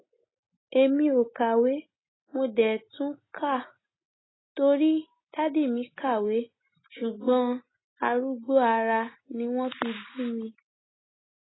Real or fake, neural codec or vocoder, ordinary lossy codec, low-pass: real; none; AAC, 16 kbps; 7.2 kHz